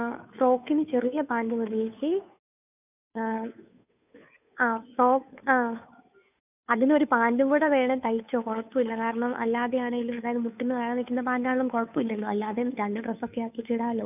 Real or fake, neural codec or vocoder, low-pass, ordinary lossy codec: fake; codec, 16 kHz, 2 kbps, FunCodec, trained on Chinese and English, 25 frames a second; 3.6 kHz; none